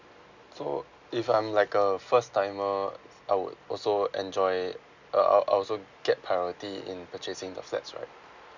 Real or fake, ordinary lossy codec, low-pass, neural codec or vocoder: real; none; 7.2 kHz; none